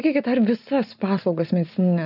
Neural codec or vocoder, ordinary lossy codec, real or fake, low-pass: none; AAC, 48 kbps; real; 5.4 kHz